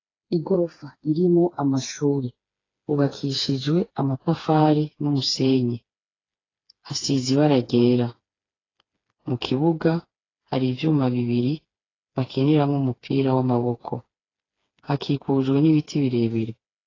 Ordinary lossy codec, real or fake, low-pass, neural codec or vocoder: AAC, 32 kbps; fake; 7.2 kHz; codec, 16 kHz, 4 kbps, FreqCodec, smaller model